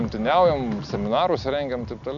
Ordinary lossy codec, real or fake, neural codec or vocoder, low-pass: MP3, 96 kbps; real; none; 7.2 kHz